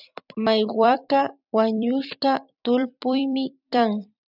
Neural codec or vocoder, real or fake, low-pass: vocoder, 44.1 kHz, 128 mel bands every 256 samples, BigVGAN v2; fake; 5.4 kHz